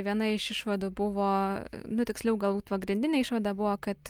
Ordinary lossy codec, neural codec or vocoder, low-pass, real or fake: Opus, 32 kbps; none; 19.8 kHz; real